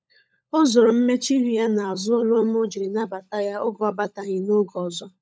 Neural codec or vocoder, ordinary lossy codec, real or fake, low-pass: codec, 16 kHz, 16 kbps, FunCodec, trained on LibriTTS, 50 frames a second; none; fake; none